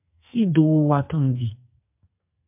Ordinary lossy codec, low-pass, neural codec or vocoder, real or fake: MP3, 32 kbps; 3.6 kHz; codec, 44.1 kHz, 2.6 kbps, SNAC; fake